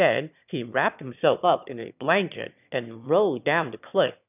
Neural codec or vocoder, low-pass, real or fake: autoencoder, 22.05 kHz, a latent of 192 numbers a frame, VITS, trained on one speaker; 3.6 kHz; fake